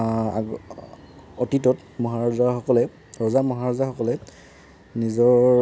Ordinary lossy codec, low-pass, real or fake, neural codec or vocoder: none; none; real; none